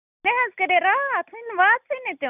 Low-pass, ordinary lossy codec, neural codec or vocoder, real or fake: 3.6 kHz; none; none; real